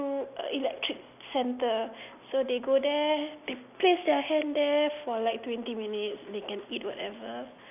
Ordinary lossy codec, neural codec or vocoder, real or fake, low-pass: none; none; real; 3.6 kHz